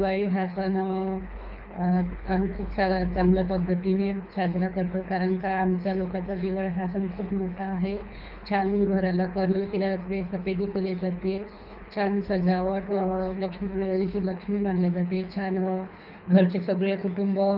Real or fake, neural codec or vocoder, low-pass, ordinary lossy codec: fake; codec, 24 kHz, 3 kbps, HILCodec; 5.4 kHz; none